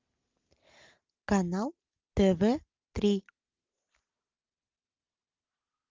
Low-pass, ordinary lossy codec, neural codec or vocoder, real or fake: 7.2 kHz; Opus, 32 kbps; none; real